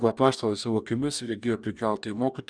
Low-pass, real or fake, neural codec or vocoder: 9.9 kHz; fake; codec, 44.1 kHz, 2.6 kbps, DAC